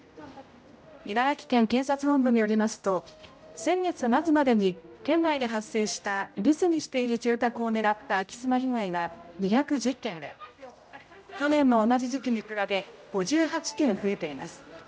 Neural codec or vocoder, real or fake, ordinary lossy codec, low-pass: codec, 16 kHz, 0.5 kbps, X-Codec, HuBERT features, trained on general audio; fake; none; none